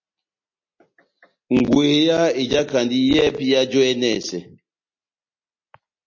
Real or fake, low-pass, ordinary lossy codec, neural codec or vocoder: real; 7.2 kHz; MP3, 32 kbps; none